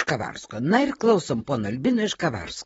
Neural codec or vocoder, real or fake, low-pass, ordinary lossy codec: vocoder, 44.1 kHz, 128 mel bands, Pupu-Vocoder; fake; 19.8 kHz; AAC, 24 kbps